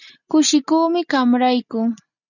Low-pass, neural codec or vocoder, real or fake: 7.2 kHz; none; real